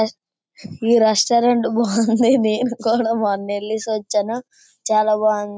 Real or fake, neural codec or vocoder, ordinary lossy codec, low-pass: real; none; none; none